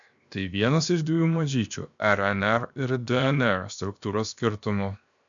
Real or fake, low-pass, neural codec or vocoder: fake; 7.2 kHz; codec, 16 kHz, 0.7 kbps, FocalCodec